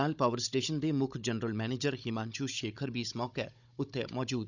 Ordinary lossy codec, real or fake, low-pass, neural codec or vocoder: none; fake; 7.2 kHz; codec, 16 kHz, 16 kbps, FunCodec, trained on Chinese and English, 50 frames a second